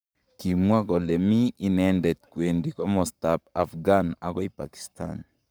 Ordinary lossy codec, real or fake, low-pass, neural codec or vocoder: none; fake; none; codec, 44.1 kHz, 7.8 kbps, Pupu-Codec